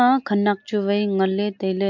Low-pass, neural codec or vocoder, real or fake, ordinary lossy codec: 7.2 kHz; none; real; MP3, 48 kbps